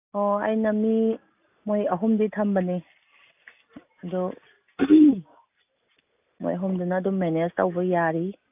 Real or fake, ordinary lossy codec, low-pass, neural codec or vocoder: real; AAC, 32 kbps; 3.6 kHz; none